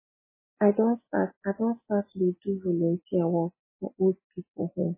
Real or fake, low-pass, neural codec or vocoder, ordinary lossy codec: real; 3.6 kHz; none; MP3, 16 kbps